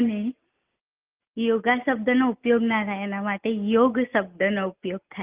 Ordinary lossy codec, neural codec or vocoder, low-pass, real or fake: Opus, 32 kbps; none; 3.6 kHz; real